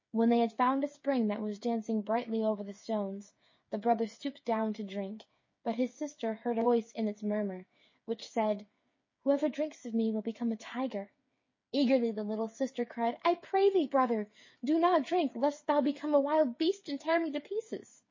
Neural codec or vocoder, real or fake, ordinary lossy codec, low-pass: codec, 16 kHz, 8 kbps, FreqCodec, smaller model; fake; MP3, 32 kbps; 7.2 kHz